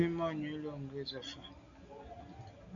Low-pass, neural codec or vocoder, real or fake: 7.2 kHz; none; real